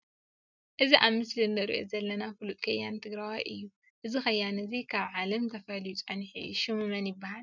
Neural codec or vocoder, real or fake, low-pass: none; real; 7.2 kHz